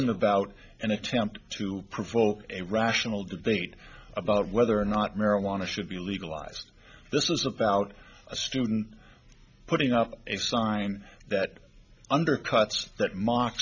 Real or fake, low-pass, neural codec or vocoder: real; 7.2 kHz; none